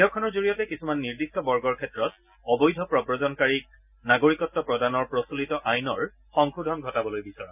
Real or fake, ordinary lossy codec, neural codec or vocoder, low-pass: real; none; none; 3.6 kHz